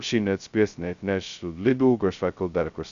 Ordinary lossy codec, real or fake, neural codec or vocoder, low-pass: Opus, 64 kbps; fake; codec, 16 kHz, 0.2 kbps, FocalCodec; 7.2 kHz